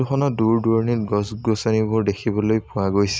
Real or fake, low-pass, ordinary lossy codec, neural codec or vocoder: real; none; none; none